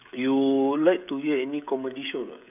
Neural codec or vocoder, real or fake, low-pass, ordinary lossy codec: codec, 16 kHz, 16 kbps, FreqCodec, smaller model; fake; 3.6 kHz; none